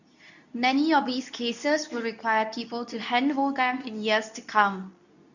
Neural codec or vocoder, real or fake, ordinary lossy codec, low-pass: codec, 24 kHz, 0.9 kbps, WavTokenizer, medium speech release version 2; fake; none; 7.2 kHz